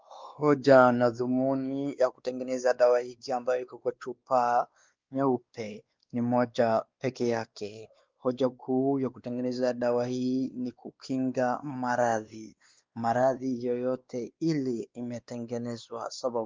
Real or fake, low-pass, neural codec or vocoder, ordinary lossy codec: fake; 7.2 kHz; codec, 16 kHz, 2 kbps, X-Codec, WavLM features, trained on Multilingual LibriSpeech; Opus, 32 kbps